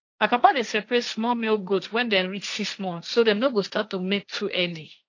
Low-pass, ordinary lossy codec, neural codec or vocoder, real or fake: 7.2 kHz; AAC, 48 kbps; codec, 16 kHz, 1.1 kbps, Voila-Tokenizer; fake